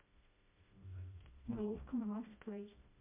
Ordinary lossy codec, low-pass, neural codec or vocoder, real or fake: MP3, 24 kbps; 3.6 kHz; codec, 16 kHz, 1 kbps, FreqCodec, smaller model; fake